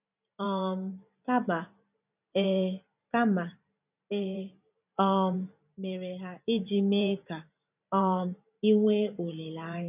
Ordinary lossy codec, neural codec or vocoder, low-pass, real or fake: none; vocoder, 44.1 kHz, 128 mel bands every 512 samples, BigVGAN v2; 3.6 kHz; fake